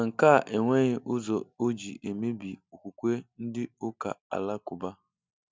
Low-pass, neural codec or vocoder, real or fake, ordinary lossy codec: none; none; real; none